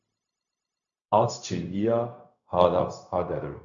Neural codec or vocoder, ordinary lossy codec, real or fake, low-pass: codec, 16 kHz, 0.4 kbps, LongCat-Audio-Codec; MP3, 96 kbps; fake; 7.2 kHz